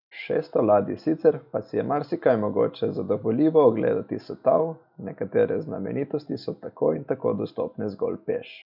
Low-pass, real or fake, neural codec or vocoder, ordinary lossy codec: 5.4 kHz; real; none; none